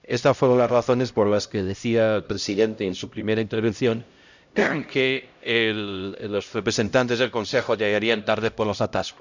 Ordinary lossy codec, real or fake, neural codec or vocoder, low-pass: none; fake; codec, 16 kHz, 0.5 kbps, X-Codec, HuBERT features, trained on LibriSpeech; 7.2 kHz